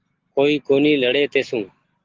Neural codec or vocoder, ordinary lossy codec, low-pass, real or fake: none; Opus, 16 kbps; 7.2 kHz; real